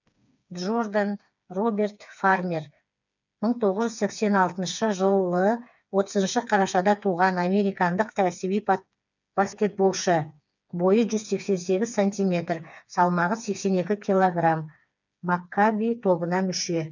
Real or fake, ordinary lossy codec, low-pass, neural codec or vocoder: fake; none; 7.2 kHz; codec, 16 kHz, 4 kbps, FreqCodec, smaller model